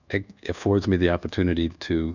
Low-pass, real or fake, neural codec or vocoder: 7.2 kHz; fake; codec, 24 kHz, 1.2 kbps, DualCodec